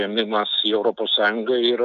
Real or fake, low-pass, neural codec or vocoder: real; 7.2 kHz; none